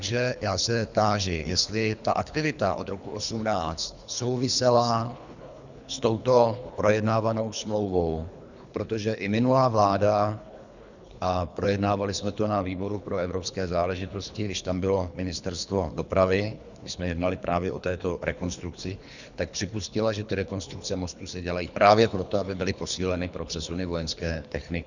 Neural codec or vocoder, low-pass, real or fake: codec, 24 kHz, 3 kbps, HILCodec; 7.2 kHz; fake